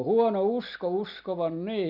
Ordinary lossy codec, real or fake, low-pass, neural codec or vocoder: none; real; 5.4 kHz; none